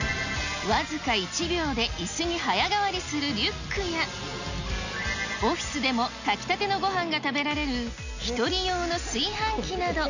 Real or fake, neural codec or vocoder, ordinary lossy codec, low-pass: real; none; none; 7.2 kHz